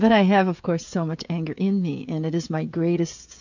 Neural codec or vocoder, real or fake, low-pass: codec, 16 kHz, 16 kbps, FreqCodec, smaller model; fake; 7.2 kHz